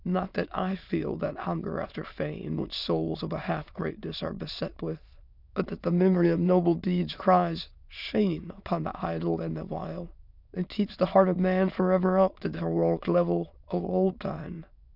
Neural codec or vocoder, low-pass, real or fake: autoencoder, 22.05 kHz, a latent of 192 numbers a frame, VITS, trained on many speakers; 5.4 kHz; fake